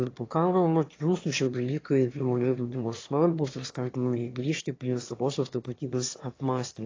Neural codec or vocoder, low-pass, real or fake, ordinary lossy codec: autoencoder, 22.05 kHz, a latent of 192 numbers a frame, VITS, trained on one speaker; 7.2 kHz; fake; AAC, 32 kbps